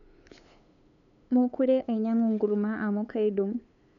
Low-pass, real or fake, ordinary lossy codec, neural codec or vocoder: 7.2 kHz; fake; none; codec, 16 kHz, 8 kbps, FunCodec, trained on LibriTTS, 25 frames a second